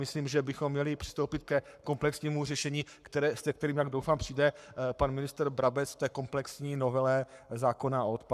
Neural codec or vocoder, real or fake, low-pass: codec, 44.1 kHz, 7.8 kbps, Pupu-Codec; fake; 14.4 kHz